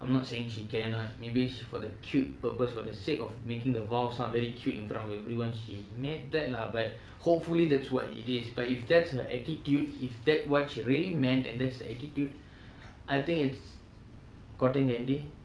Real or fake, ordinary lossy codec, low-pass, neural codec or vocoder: fake; none; none; vocoder, 22.05 kHz, 80 mel bands, WaveNeXt